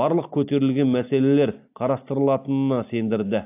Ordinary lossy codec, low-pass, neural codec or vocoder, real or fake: AAC, 32 kbps; 3.6 kHz; none; real